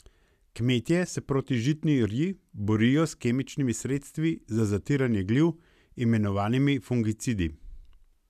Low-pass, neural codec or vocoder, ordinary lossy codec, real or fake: 14.4 kHz; none; none; real